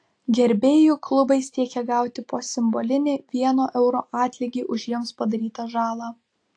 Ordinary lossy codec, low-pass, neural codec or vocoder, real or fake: AAC, 48 kbps; 9.9 kHz; none; real